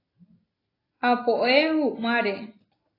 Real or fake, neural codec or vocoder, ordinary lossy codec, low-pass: real; none; AAC, 24 kbps; 5.4 kHz